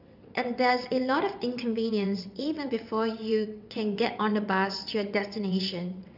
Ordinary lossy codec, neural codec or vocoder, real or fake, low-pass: none; vocoder, 22.05 kHz, 80 mel bands, WaveNeXt; fake; 5.4 kHz